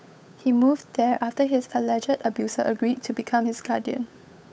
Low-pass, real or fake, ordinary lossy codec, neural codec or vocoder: none; fake; none; codec, 16 kHz, 8 kbps, FunCodec, trained on Chinese and English, 25 frames a second